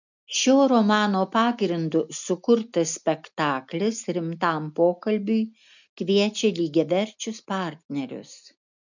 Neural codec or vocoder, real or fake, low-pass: none; real; 7.2 kHz